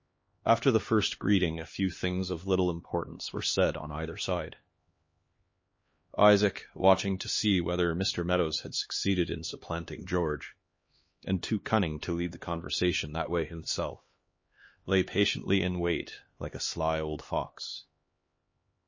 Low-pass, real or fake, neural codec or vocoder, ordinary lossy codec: 7.2 kHz; fake; codec, 16 kHz, 2 kbps, X-Codec, HuBERT features, trained on LibriSpeech; MP3, 32 kbps